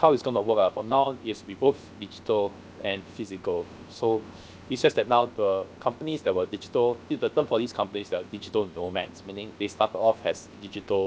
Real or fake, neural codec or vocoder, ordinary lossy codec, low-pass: fake; codec, 16 kHz, 0.7 kbps, FocalCodec; none; none